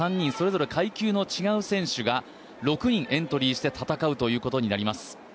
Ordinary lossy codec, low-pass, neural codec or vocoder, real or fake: none; none; none; real